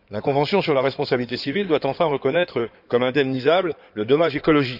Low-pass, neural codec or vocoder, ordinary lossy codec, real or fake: 5.4 kHz; codec, 16 kHz in and 24 kHz out, 2.2 kbps, FireRedTTS-2 codec; none; fake